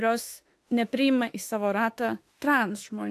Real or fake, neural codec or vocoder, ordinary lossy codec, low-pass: fake; autoencoder, 48 kHz, 32 numbers a frame, DAC-VAE, trained on Japanese speech; AAC, 64 kbps; 14.4 kHz